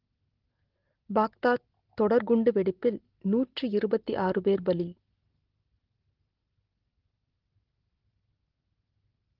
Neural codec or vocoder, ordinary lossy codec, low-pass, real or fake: none; Opus, 16 kbps; 5.4 kHz; real